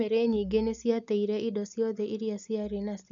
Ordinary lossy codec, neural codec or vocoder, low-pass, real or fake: none; none; 7.2 kHz; real